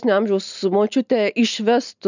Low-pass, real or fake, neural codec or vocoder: 7.2 kHz; real; none